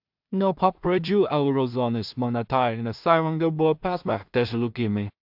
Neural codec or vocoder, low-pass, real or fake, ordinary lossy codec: codec, 16 kHz in and 24 kHz out, 0.4 kbps, LongCat-Audio-Codec, two codebook decoder; 5.4 kHz; fake; AAC, 48 kbps